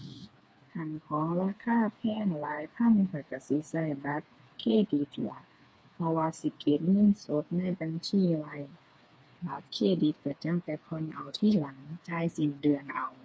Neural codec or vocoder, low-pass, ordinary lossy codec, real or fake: codec, 16 kHz, 4 kbps, FreqCodec, smaller model; none; none; fake